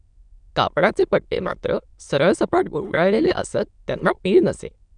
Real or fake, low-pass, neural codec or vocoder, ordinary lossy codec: fake; 9.9 kHz; autoencoder, 22.05 kHz, a latent of 192 numbers a frame, VITS, trained on many speakers; none